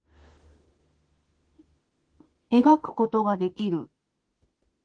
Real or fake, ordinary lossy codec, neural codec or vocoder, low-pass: fake; Opus, 16 kbps; autoencoder, 48 kHz, 32 numbers a frame, DAC-VAE, trained on Japanese speech; 9.9 kHz